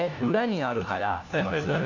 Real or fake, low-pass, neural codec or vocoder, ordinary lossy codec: fake; 7.2 kHz; codec, 16 kHz, 1 kbps, FunCodec, trained on LibriTTS, 50 frames a second; none